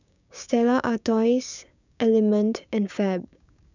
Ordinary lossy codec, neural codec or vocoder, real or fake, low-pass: none; codec, 16 kHz, 6 kbps, DAC; fake; 7.2 kHz